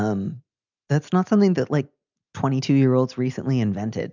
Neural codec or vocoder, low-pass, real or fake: none; 7.2 kHz; real